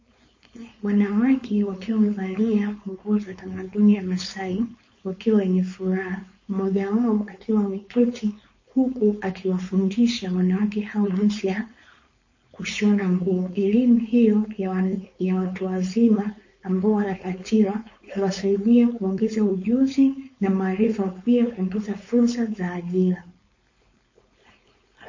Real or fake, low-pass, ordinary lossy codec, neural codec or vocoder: fake; 7.2 kHz; MP3, 32 kbps; codec, 16 kHz, 4.8 kbps, FACodec